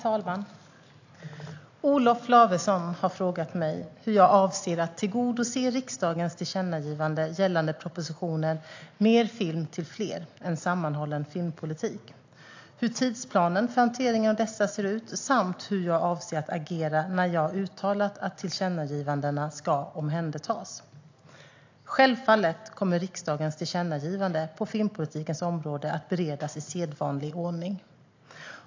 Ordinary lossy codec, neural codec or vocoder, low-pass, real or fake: AAC, 48 kbps; none; 7.2 kHz; real